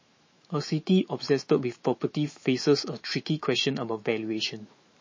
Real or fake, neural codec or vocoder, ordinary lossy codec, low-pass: fake; autoencoder, 48 kHz, 128 numbers a frame, DAC-VAE, trained on Japanese speech; MP3, 32 kbps; 7.2 kHz